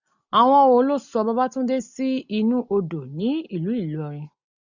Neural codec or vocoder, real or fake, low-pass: none; real; 7.2 kHz